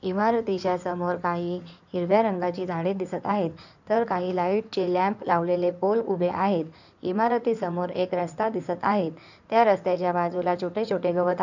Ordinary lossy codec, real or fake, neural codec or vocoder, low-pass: none; fake; codec, 16 kHz in and 24 kHz out, 2.2 kbps, FireRedTTS-2 codec; 7.2 kHz